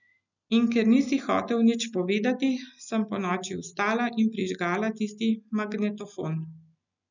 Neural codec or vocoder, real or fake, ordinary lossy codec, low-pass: none; real; none; 7.2 kHz